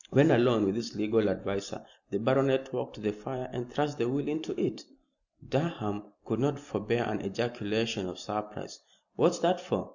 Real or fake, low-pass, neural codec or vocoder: real; 7.2 kHz; none